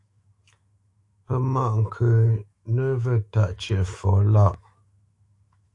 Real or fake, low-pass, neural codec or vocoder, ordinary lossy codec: fake; 10.8 kHz; autoencoder, 48 kHz, 128 numbers a frame, DAC-VAE, trained on Japanese speech; AAC, 48 kbps